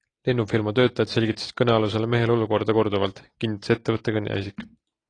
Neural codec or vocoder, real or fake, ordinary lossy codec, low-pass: none; real; AAC, 32 kbps; 9.9 kHz